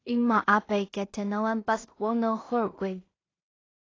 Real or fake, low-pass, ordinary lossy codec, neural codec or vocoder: fake; 7.2 kHz; AAC, 32 kbps; codec, 16 kHz in and 24 kHz out, 0.4 kbps, LongCat-Audio-Codec, two codebook decoder